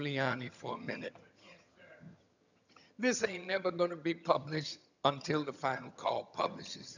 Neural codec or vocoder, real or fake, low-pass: vocoder, 22.05 kHz, 80 mel bands, HiFi-GAN; fake; 7.2 kHz